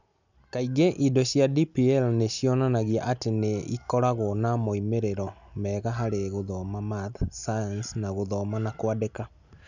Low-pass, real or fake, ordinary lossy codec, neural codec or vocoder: 7.2 kHz; real; none; none